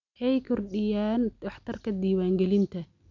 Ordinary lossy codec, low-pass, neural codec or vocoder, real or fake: none; 7.2 kHz; none; real